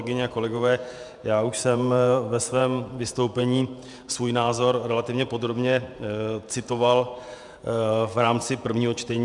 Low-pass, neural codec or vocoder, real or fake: 10.8 kHz; vocoder, 48 kHz, 128 mel bands, Vocos; fake